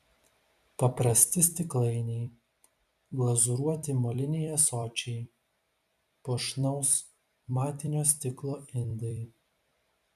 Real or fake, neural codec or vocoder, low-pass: fake; vocoder, 44.1 kHz, 128 mel bands every 256 samples, BigVGAN v2; 14.4 kHz